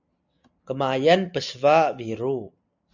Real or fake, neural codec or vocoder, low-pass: real; none; 7.2 kHz